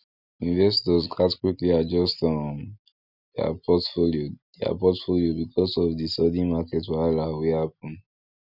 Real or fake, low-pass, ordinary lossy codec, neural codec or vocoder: real; 5.4 kHz; none; none